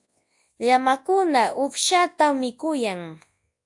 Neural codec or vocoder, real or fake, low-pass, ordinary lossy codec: codec, 24 kHz, 0.9 kbps, WavTokenizer, large speech release; fake; 10.8 kHz; AAC, 64 kbps